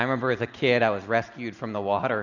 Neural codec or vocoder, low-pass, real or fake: none; 7.2 kHz; real